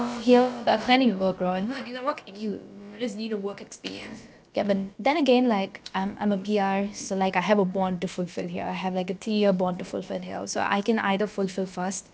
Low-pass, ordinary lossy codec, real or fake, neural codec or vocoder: none; none; fake; codec, 16 kHz, about 1 kbps, DyCAST, with the encoder's durations